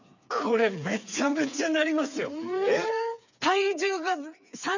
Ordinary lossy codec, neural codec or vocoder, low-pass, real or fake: none; codec, 16 kHz, 4 kbps, FreqCodec, smaller model; 7.2 kHz; fake